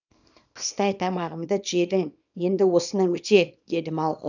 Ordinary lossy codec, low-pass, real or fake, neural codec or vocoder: none; 7.2 kHz; fake; codec, 24 kHz, 0.9 kbps, WavTokenizer, small release